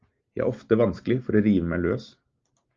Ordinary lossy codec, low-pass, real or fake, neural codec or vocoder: Opus, 24 kbps; 7.2 kHz; real; none